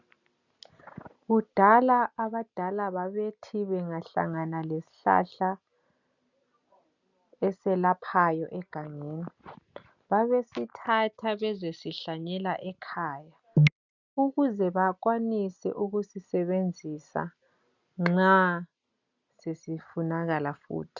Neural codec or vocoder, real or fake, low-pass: none; real; 7.2 kHz